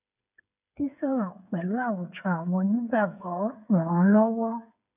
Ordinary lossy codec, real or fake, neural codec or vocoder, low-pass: none; fake; codec, 16 kHz, 8 kbps, FreqCodec, smaller model; 3.6 kHz